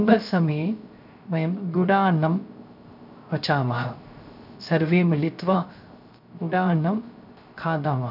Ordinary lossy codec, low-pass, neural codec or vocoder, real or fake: none; 5.4 kHz; codec, 16 kHz, 0.3 kbps, FocalCodec; fake